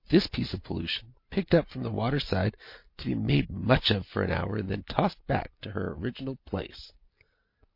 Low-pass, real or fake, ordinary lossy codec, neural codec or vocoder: 5.4 kHz; real; MP3, 32 kbps; none